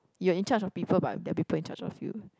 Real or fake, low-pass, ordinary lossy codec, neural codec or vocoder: real; none; none; none